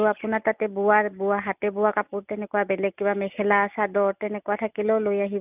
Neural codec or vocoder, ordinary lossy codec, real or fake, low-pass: none; none; real; 3.6 kHz